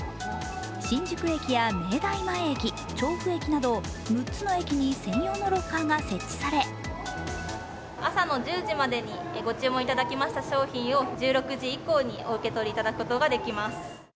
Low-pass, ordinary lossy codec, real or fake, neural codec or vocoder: none; none; real; none